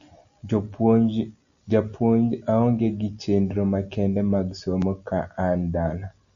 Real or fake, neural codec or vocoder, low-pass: real; none; 7.2 kHz